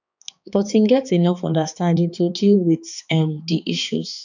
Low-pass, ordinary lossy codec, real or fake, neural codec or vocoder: 7.2 kHz; none; fake; codec, 16 kHz, 4 kbps, X-Codec, HuBERT features, trained on balanced general audio